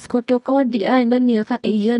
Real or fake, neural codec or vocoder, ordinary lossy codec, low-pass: fake; codec, 24 kHz, 0.9 kbps, WavTokenizer, medium music audio release; none; 10.8 kHz